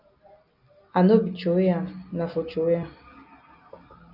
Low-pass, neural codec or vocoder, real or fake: 5.4 kHz; none; real